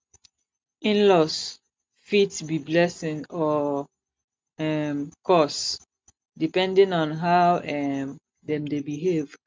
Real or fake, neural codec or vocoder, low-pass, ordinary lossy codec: real; none; none; none